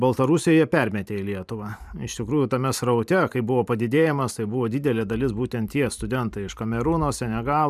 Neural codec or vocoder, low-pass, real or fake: vocoder, 44.1 kHz, 128 mel bands every 512 samples, BigVGAN v2; 14.4 kHz; fake